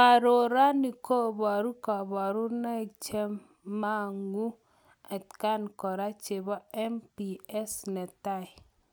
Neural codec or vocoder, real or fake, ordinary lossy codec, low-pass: none; real; none; none